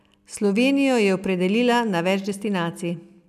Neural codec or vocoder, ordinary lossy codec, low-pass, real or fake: none; none; 14.4 kHz; real